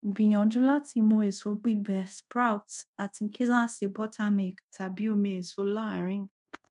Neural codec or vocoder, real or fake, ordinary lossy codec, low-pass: codec, 24 kHz, 0.5 kbps, DualCodec; fake; none; 10.8 kHz